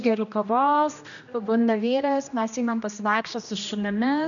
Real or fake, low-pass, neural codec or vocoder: fake; 7.2 kHz; codec, 16 kHz, 1 kbps, X-Codec, HuBERT features, trained on general audio